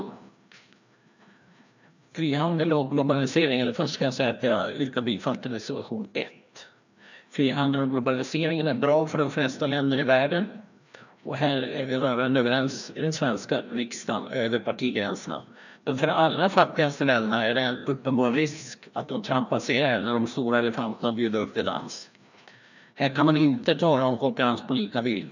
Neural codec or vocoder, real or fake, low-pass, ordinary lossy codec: codec, 16 kHz, 1 kbps, FreqCodec, larger model; fake; 7.2 kHz; none